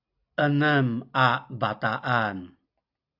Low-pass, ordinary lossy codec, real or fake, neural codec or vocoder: 5.4 kHz; AAC, 48 kbps; real; none